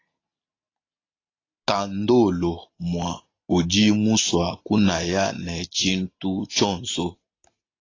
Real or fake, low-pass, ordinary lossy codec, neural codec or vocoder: real; 7.2 kHz; AAC, 32 kbps; none